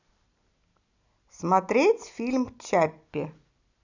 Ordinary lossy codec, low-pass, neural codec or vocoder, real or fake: none; 7.2 kHz; none; real